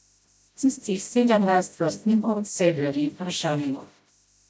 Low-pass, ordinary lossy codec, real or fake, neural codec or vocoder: none; none; fake; codec, 16 kHz, 0.5 kbps, FreqCodec, smaller model